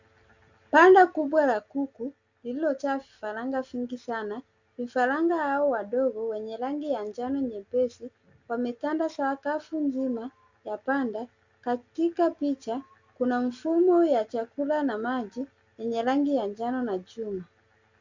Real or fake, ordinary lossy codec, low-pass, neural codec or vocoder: real; Opus, 64 kbps; 7.2 kHz; none